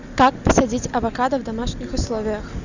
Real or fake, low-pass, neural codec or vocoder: real; 7.2 kHz; none